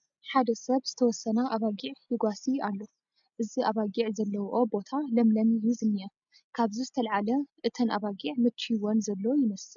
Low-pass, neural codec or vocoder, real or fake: 7.2 kHz; none; real